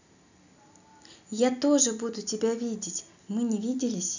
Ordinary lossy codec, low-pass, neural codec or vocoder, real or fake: none; 7.2 kHz; none; real